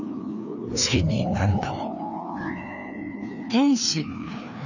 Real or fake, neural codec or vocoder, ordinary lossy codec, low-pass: fake; codec, 16 kHz, 2 kbps, FreqCodec, larger model; none; 7.2 kHz